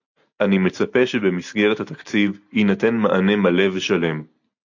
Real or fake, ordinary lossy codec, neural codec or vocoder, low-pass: real; AAC, 48 kbps; none; 7.2 kHz